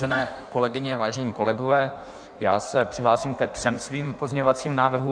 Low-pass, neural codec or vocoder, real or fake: 9.9 kHz; codec, 16 kHz in and 24 kHz out, 1.1 kbps, FireRedTTS-2 codec; fake